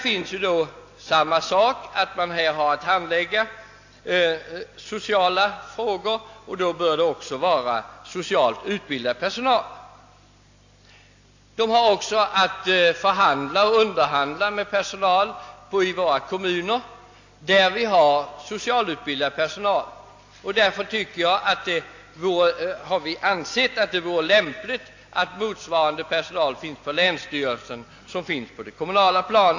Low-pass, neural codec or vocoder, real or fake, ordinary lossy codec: 7.2 kHz; none; real; AAC, 48 kbps